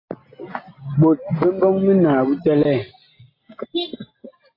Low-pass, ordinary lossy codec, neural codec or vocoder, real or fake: 5.4 kHz; AAC, 24 kbps; none; real